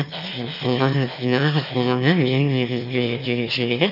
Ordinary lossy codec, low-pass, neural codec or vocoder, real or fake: none; 5.4 kHz; autoencoder, 22.05 kHz, a latent of 192 numbers a frame, VITS, trained on one speaker; fake